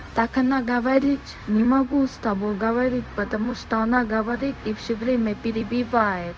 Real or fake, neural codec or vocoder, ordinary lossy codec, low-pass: fake; codec, 16 kHz, 0.4 kbps, LongCat-Audio-Codec; none; none